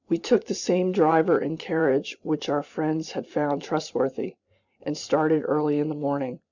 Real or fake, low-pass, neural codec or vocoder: fake; 7.2 kHz; vocoder, 44.1 kHz, 128 mel bands every 512 samples, BigVGAN v2